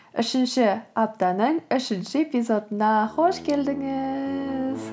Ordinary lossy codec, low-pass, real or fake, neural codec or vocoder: none; none; real; none